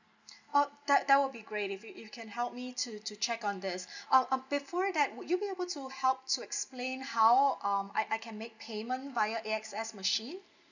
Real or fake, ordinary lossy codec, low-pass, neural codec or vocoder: real; none; 7.2 kHz; none